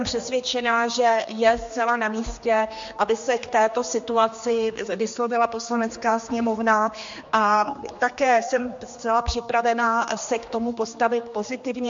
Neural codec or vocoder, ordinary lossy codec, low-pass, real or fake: codec, 16 kHz, 2 kbps, X-Codec, HuBERT features, trained on general audio; MP3, 48 kbps; 7.2 kHz; fake